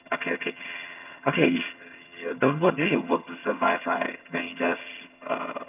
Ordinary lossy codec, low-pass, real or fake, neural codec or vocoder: none; 3.6 kHz; fake; vocoder, 22.05 kHz, 80 mel bands, HiFi-GAN